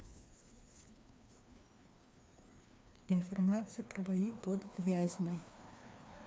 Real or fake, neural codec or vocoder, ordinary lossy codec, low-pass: fake; codec, 16 kHz, 2 kbps, FreqCodec, larger model; none; none